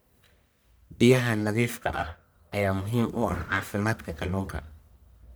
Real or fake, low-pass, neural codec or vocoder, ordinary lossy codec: fake; none; codec, 44.1 kHz, 1.7 kbps, Pupu-Codec; none